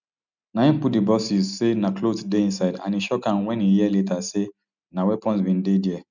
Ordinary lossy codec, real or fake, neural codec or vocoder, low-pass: none; real; none; 7.2 kHz